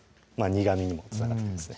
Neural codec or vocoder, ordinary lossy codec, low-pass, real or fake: none; none; none; real